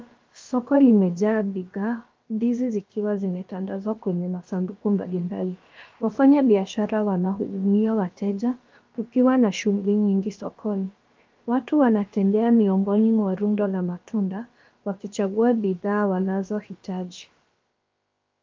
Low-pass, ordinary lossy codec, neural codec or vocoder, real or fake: 7.2 kHz; Opus, 32 kbps; codec, 16 kHz, about 1 kbps, DyCAST, with the encoder's durations; fake